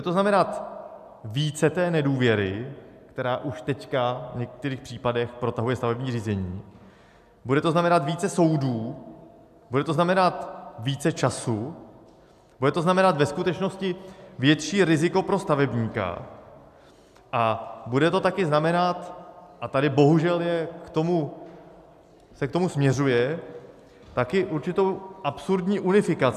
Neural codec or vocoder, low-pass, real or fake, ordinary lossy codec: none; 14.4 kHz; real; AAC, 96 kbps